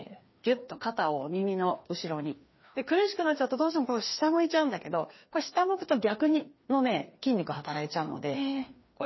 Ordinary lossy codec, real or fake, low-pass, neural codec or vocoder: MP3, 24 kbps; fake; 7.2 kHz; codec, 16 kHz, 2 kbps, FreqCodec, larger model